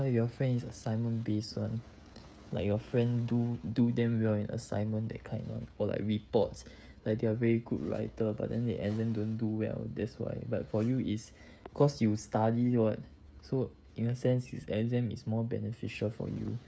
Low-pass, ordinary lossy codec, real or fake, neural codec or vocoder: none; none; fake; codec, 16 kHz, 16 kbps, FreqCodec, smaller model